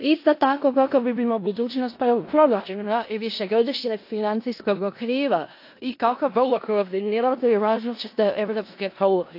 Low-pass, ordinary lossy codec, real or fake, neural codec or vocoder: 5.4 kHz; AAC, 32 kbps; fake; codec, 16 kHz in and 24 kHz out, 0.4 kbps, LongCat-Audio-Codec, four codebook decoder